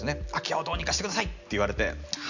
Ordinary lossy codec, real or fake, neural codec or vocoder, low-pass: none; real; none; 7.2 kHz